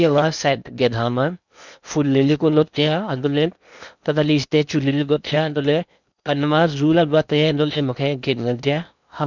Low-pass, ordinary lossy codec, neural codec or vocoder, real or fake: 7.2 kHz; none; codec, 16 kHz in and 24 kHz out, 0.8 kbps, FocalCodec, streaming, 65536 codes; fake